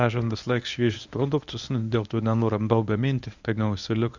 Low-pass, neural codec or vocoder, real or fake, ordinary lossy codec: 7.2 kHz; codec, 24 kHz, 0.9 kbps, WavTokenizer, medium speech release version 1; fake; Opus, 64 kbps